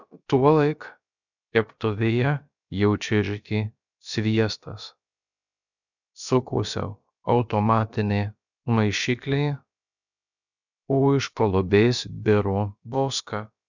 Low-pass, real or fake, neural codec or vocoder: 7.2 kHz; fake; codec, 16 kHz, about 1 kbps, DyCAST, with the encoder's durations